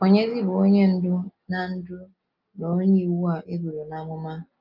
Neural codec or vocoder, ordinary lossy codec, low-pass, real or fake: none; Opus, 24 kbps; 5.4 kHz; real